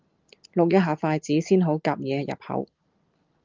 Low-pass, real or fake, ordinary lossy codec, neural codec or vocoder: 7.2 kHz; real; Opus, 24 kbps; none